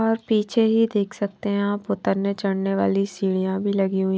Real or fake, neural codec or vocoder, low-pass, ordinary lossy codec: real; none; none; none